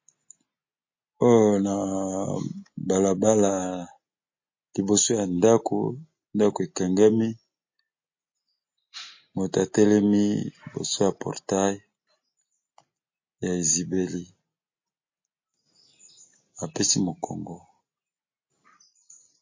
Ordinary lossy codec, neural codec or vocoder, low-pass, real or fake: MP3, 32 kbps; none; 7.2 kHz; real